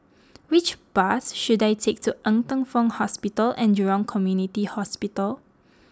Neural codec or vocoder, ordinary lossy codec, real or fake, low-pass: none; none; real; none